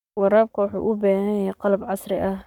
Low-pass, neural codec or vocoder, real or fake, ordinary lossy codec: 19.8 kHz; codec, 44.1 kHz, 7.8 kbps, Pupu-Codec; fake; MP3, 96 kbps